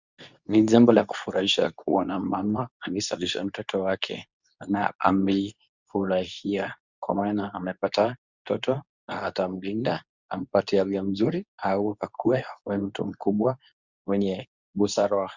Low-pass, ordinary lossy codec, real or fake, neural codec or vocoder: 7.2 kHz; Opus, 64 kbps; fake; codec, 24 kHz, 0.9 kbps, WavTokenizer, medium speech release version 2